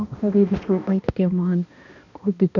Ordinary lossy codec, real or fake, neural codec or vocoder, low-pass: none; fake; codec, 16 kHz, 1 kbps, X-Codec, HuBERT features, trained on balanced general audio; 7.2 kHz